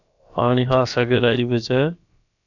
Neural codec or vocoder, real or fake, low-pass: codec, 16 kHz, about 1 kbps, DyCAST, with the encoder's durations; fake; 7.2 kHz